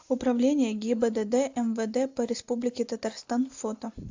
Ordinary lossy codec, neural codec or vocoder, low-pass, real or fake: AAC, 48 kbps; none; 7.2 kHz; real